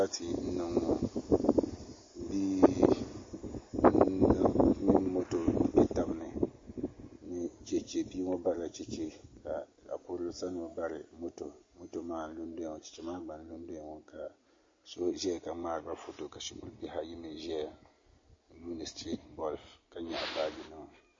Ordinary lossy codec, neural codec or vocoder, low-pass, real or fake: MP3, 32 kbps; none; 7.2 kHz; real